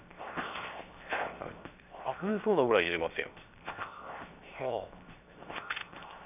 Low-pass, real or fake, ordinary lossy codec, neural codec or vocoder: 3.6 kHz; fake; none; codec, 16 kHz, 0.7 kbps, FocalCodec